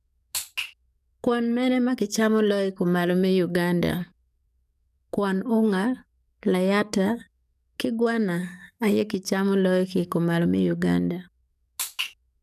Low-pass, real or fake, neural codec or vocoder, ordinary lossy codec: 14.4 kHz; fake; codec, 44.1 kHz, 7.8 kbps, DAC; none